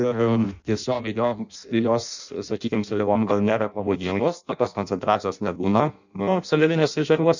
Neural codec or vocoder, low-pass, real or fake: codec, 16 kHz in and 24 kHz out, 0.6 kbps, FireRedTTS-2 codec; 7.2 kHz; fake